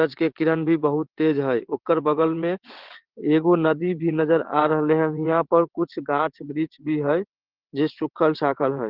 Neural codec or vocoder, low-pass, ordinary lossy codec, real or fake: vocoder, 22.05 kHz, 80 mel bands, WaveNeXt; 5.4 kHz; Opus, 16 kbps; fake